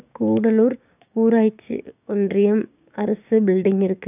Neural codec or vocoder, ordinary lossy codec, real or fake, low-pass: codec, 16 kHz, 6 kbps, DAC; none; fake; 3.6 kHz